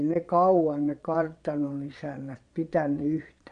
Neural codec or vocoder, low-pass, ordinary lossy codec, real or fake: vocoder, 22.05 kHz, 80 mel bands, Vocos; 9.9 kHz; none; fake